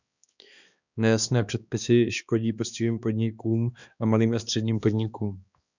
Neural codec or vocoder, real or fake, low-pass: codec, 16 kHz, 2 kbps, X-Codec, HuBERT features, trained on balanced general audio; fake; 7.2 kHz